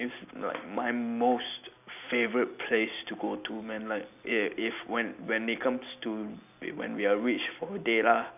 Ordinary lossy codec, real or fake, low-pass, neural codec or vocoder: none; real; 3.6 kHz; none